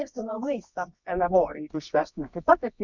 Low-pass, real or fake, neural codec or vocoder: 7.2 kHz; fake; codec, 24 kHz, 0.9 kbps, WavTokenizer, medium music audio release